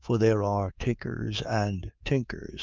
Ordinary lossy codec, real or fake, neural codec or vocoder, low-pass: Opus, 24 kbps; fake; codec, 24 kHz, 3.1 kbps, DualCodec; 7.2 kHz